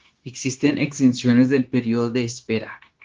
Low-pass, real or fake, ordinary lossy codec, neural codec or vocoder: 7.2 kHz; fake; Opus, 16 kbps; codec, 16 kHz, 0.9 kbps, LongCat-Audio-Codec